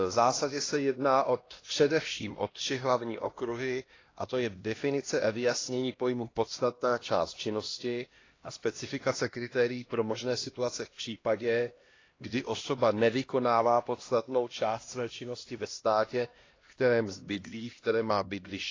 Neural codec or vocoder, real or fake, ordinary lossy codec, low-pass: codec, 16 kHz, 1 kbps, X-Codec, HuBERT features, trained on LibriSpeech; fake; AAC, 32 kbps; 7.2 kHz